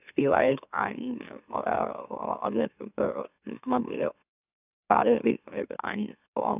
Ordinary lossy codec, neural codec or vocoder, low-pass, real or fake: none; autoencoder, 44.1 kHz, a latent of 192 numbers a frame, MeloTTS; 3.6 kHz; fake